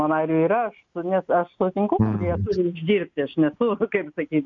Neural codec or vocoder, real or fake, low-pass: none; real; 7.2 kHz